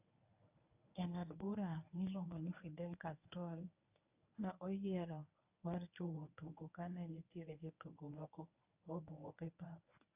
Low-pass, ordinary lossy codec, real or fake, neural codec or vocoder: 3.6 kHz; AAC, 32 kbps; fake; codec, 24 kHz, 0.9 kbps, WavTokenizer, medium speech release version 1